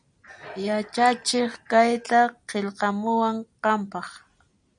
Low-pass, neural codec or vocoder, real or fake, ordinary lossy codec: 9.9 kHz; none; real; MP3, 96 kbps